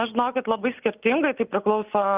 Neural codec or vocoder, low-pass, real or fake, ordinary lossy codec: none; 3.6 kHz; real; Opus, 24 kbps